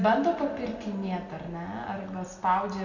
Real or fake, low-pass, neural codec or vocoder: real; 7.2 kHz; none